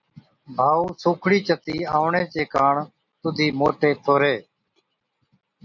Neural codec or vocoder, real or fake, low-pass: none; real; 7.2 kHz